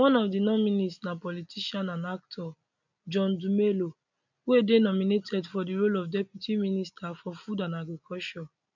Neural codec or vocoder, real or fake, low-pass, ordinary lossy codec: none; real; 7.2 kHz; none